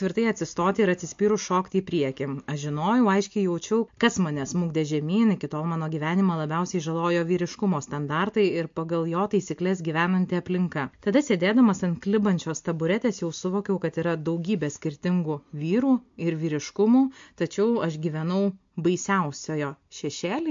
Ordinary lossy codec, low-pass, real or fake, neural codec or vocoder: MP3, 48 kbps; 7.2 kHz; real; none